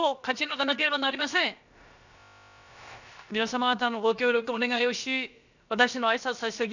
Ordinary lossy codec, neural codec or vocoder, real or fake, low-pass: none; codec, 16 kHz, about 1 kbps, DyCAST, with the encoder's durations; fake; 7.2 kHz